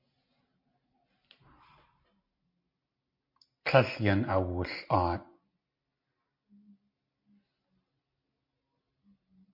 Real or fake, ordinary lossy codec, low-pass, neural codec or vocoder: real; MP3, 32 kbps; 5.4 kHz; none